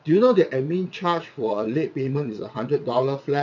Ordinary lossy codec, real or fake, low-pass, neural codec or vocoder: none; fake; 7.2 kHz; vocoder, 22.05 kHz, 80 mel bands, WaveNeXt